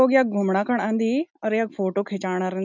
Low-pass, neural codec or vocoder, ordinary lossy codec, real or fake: 7.2 kHz; none; none; real